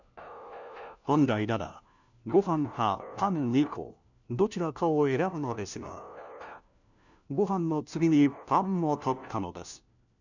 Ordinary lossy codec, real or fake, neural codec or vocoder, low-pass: none; fake; codec, 16 kHz, 1 kbps, FunCodec, trained on LibriTTS, 50 frames a second; 7.2 kHz